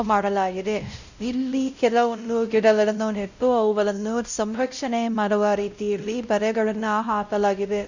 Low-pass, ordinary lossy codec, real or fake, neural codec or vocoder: 7.2 kHz; none; fake; codec, 16 kHz, 0.5 kbps, X-Codec, WavLM features, trained on Multilingual LibriSpeech